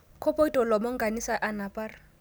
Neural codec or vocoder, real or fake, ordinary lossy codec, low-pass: none; real; none; none